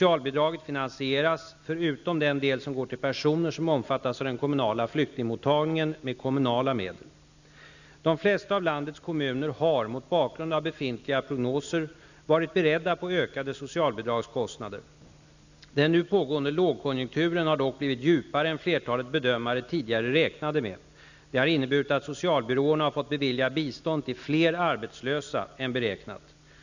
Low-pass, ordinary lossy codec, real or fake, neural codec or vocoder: 7.2 kHz; none; real; none